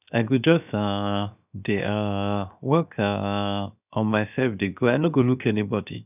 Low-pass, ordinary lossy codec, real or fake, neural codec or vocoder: 3.6 kHz; none; fake; codec, 16 kHz, 0.7 kbps, FocalCodec